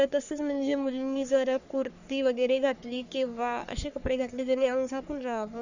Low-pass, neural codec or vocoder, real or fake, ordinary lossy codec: 7.2 kHz; codec, 44.1 kHz, 3.4 kbps, Pupu-Codec; fake; none